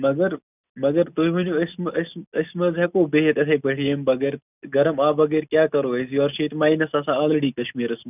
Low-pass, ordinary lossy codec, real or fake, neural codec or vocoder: 3.6 kHz; none; real; none